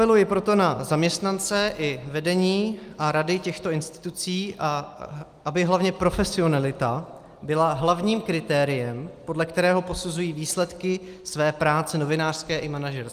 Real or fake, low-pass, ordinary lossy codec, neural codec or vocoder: real; 14.4 kHz; Opus, 32 kbps; none